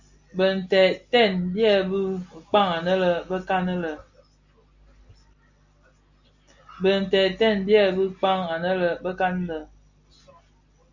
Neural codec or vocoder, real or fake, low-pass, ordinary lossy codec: none; real; 7.2 kHz; Opus, 64 kbps